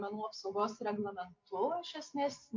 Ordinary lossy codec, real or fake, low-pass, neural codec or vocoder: MP3, 48 kbps; real; 7.2 kHz; none